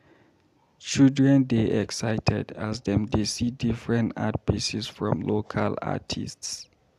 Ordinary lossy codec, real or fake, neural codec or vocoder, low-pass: AAC, 96 kbps; real; none; 14.4 kHz